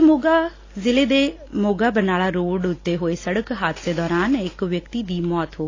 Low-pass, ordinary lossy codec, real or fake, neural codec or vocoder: 7.2 kHz; AAC, 32 kbps; real; none